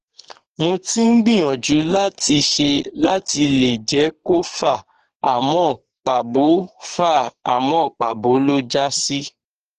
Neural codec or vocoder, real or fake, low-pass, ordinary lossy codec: codec, 44.1 kHz, 2.6 kbps, SNAC; fake; 14.4 kHz; Opus, 16 kbps